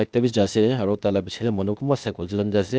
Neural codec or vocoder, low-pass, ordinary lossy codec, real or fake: codec, 16 kHz, 0.8 kbps, ZipCodec; none; none; fake